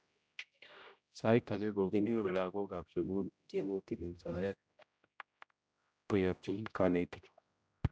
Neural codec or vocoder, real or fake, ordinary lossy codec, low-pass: codec, 16 kHz, 0.5 kbps, X-Codec, HuBERT features, trained on general audio; fake; none; none